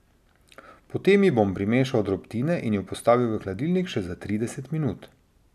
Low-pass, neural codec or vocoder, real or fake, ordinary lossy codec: 14.4 kHz; none; real; none